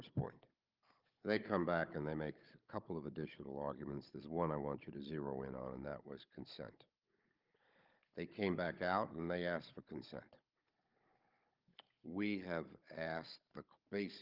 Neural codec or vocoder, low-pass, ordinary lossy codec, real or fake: codec, 16 kHz, 16 kbps, FunCodec, trained on Chinese and English, 50 frames a second; 5.4 kHz; Opus, 32 kbps; fake